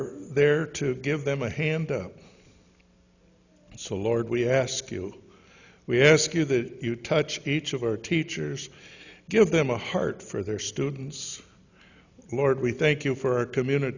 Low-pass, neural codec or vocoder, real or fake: 7.2 kHz; none; real